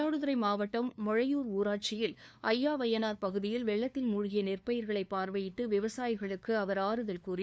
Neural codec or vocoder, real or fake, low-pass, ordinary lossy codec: codec, 16 kHz, 2 kbps, FunCodec, trained on LibriTTS, 25 frames a second; fake; none; none